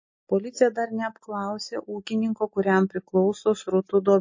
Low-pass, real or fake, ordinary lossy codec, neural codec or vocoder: 7.2 kHz; real; MP3, 32 kbps; none